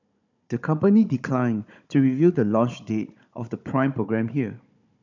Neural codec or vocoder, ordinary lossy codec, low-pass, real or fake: codec, 16 kHz, 16 kbps, FunCodec, trained on Chinese and English, 50 frames a second; none; 7.2 kHz; fake